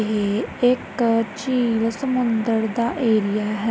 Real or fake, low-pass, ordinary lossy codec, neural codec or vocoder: real; none; none; none